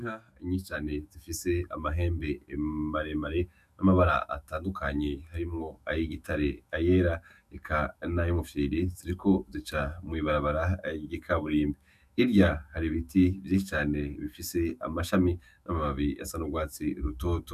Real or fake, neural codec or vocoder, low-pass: fake; autoencoder, 48 kHz, 128 numbers a frame, DAC-VAE, trained on Japanese speech; 14.4 kHz